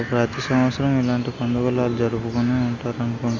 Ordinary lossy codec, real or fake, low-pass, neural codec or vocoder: Opus, 32 kbps; real; 7.2 kHz; none